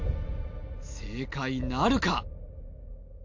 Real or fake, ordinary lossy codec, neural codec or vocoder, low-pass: real; MP3, 48 kbps; none; 7.2 kHz